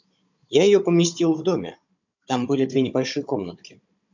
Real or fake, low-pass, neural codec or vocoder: fake; 7.2 kHz; codec, 16 kHz, 16 kbps, FunCodec, trained on Chinese and English, 50 frames a second